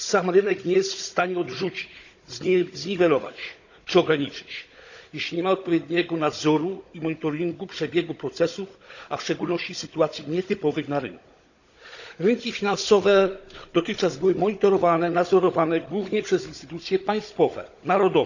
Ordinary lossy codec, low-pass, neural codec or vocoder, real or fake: none; 7.2 kHz; codec, 16 kHz, 16 kbps, FunCodec, trained on Chinese and English, 50 frames a second; fake